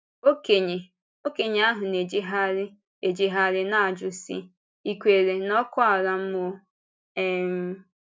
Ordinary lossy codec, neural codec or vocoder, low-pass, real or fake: none; none; none; real